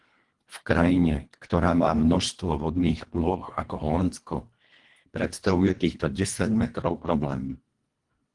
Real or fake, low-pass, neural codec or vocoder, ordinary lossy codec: fake; 10.8 kHz; codec, 24 kHz, 1.5 kbps, HILCodec; Opus, 24 kbps